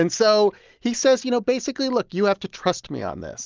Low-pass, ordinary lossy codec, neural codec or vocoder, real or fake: 7.2 kHz; Opus, 24 kbps; none; real